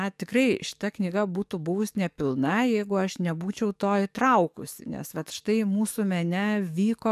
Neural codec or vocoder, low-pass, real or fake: codec, 44.1 kHz, 7.8 kbps, DAC; 14.4 kHz; fake